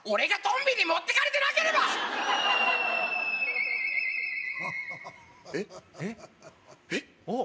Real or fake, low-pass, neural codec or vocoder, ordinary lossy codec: real; none; none; none